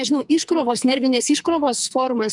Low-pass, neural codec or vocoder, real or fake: 10.8 kHz; codec, 44.1 kHz, 2.6 kbps, SNAC; fake